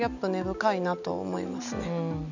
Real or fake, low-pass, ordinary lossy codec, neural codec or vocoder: real; 7.2 kHz; none; none